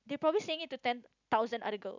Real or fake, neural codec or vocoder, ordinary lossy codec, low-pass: real; none; none; 7.2 kHz